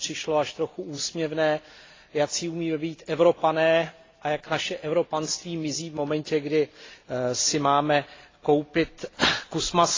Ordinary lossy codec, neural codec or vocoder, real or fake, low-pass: AAC, 32 kbps; none; real; 7.2 kHz